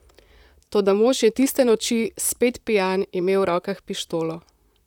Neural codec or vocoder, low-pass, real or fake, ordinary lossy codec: vocoder, 44.1 kHz, 128 mel bands, Pupu-Vocoder; 19.8 kHz; fake; none